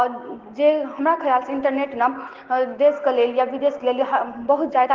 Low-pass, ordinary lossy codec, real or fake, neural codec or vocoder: 7.2 kHz; Opus, 16 kbps; real; none